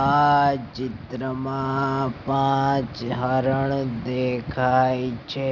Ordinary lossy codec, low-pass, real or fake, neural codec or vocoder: none; 7.2 kHz; real; none